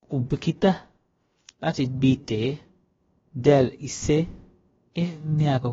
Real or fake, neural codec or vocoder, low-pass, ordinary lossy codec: fake; codec, 16 kHz, about 1 kbps, DyCAST, with the encoder's durations; 7.2 kHz; AAC, 24 kbps